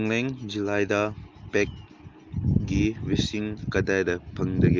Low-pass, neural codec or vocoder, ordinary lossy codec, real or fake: 7.2 kHz; none; Opus, 32 kbps; real